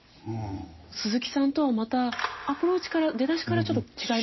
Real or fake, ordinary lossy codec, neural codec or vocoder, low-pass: real; MP3, 24 kbps; none; 7.2 kHz